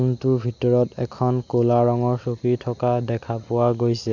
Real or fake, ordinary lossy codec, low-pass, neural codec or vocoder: real; none; 7.2 kHz; none